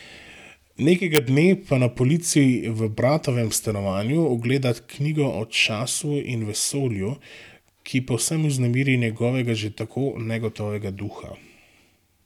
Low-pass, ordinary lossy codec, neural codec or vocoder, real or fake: 19.8 kHz; none; none; real